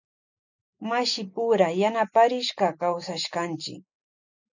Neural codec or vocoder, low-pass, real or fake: none; 7.2 kHz; real